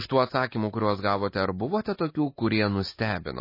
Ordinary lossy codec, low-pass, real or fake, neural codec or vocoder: MP3, 24 kbps; 5.4 kHz; real; none